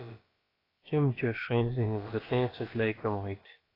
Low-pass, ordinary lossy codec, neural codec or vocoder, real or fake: 5.4 kHz; AAC, 24 kbps; codec, 16 kHz, about 1 kbps, DyCAST, with the encoder's durations; fake